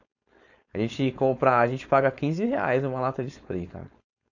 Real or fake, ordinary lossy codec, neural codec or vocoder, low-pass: fake; none; codec, 16 kHz, 4.8 kbps, FACodec; 7.2 kHz